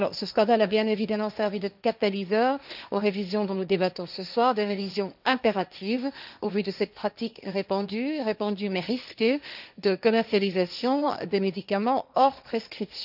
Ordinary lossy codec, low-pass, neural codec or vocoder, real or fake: none; 5.4 kHz; codec, 16 kHz, 1.1 kbps, Voila-Tokenizer; fake